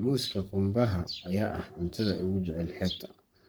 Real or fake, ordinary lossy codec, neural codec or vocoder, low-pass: fake; none; codec, 44.1 kHz, 3.4 kbps, Pupu-Codec; none